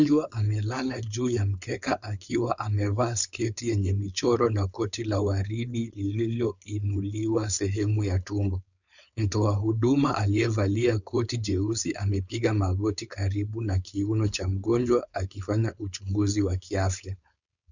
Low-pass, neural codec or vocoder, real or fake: 7.2 kHz; codec, 16 kHz, 4.8 kbps, FACodec; fake